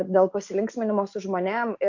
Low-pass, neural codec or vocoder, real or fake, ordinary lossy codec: 7.2 kHz; none; real; MP3, 48 kbps